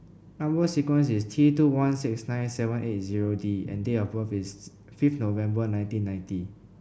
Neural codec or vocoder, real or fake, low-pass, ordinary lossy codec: none; real; none; none